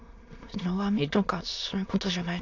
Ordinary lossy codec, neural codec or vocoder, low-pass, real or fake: AAC, 48 kbps; autoencoder, 22.05 kHz, a latent of 192 numbers a frame, VITS, trained on many speakers; 7.2 kHz; fake